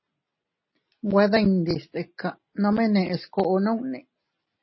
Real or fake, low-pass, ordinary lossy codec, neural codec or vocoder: real; 7.2 kHz; MP3, 24 kbps; none